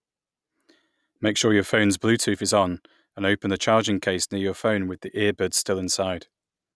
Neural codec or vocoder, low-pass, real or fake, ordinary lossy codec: none; none; real; none